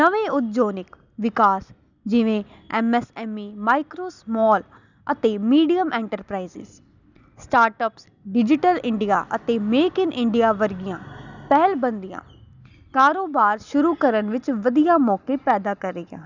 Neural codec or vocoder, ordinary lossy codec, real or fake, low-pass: none; none; real; 7.2 kHz